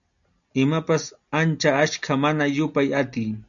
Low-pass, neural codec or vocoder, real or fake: 7.2 kHz; none; real